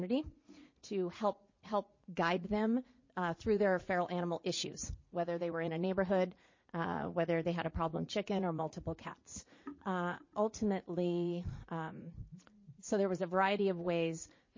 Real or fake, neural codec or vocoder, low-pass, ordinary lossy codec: fake; vocoder, 44.1 kHz, 80 mel bands, Vocos; 7.2 kHz; MP3, 32 kbps